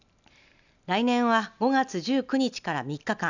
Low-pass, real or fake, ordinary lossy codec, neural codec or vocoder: 7.2 kHz; real; none; none